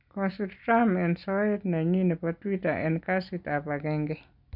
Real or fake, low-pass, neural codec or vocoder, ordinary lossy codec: real; 5.4 kHz; none; none